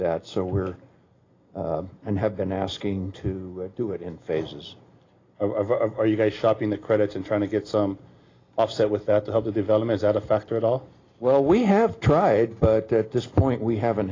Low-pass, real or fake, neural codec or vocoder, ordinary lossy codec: 7.2 kHz; real; none; AAC, 32 kbps